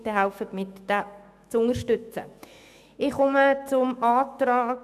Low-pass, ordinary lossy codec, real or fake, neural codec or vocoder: 14.4 kHz; none; fake; autoencoder, 48 kHz, 128 numbers a frame, DAC-VAE, trained on Japanese speech